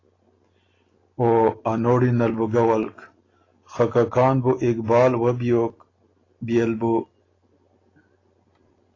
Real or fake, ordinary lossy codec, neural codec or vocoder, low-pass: real; AAC, 32 kbps; none; 7.2 kHz